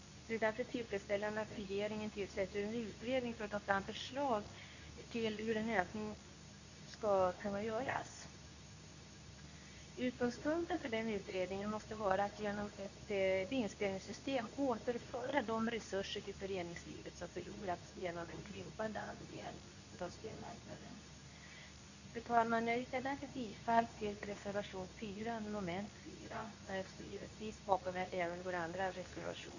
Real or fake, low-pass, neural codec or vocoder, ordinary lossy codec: fake; 7.2 kHz; codec, 24 kHz, 0.9 kbps, WavTokenizer, medium speech release version 2; none